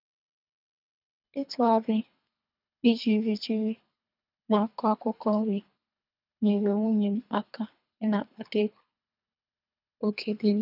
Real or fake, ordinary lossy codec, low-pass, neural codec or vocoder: fake; none; 5.4 kHz; codec, 24 kHz, 3 kbps, HILCodec